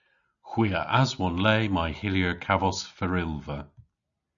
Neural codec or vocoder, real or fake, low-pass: none; real; 7.2 kHz